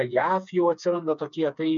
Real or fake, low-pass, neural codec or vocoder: fake; 7.2 kHz; codec, 16 kHz, 4 kbps, FreqCodec, smaller model